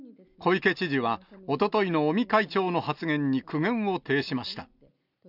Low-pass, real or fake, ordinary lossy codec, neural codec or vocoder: 5.4 kHz; real; none; none